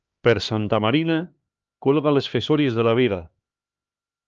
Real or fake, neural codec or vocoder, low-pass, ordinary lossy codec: fake; codec, 16 kHz, 2 kbps, X-Codec, HuBERT features, trained on LibriSpeech; 7.2 kHz; Opus, 24 kbps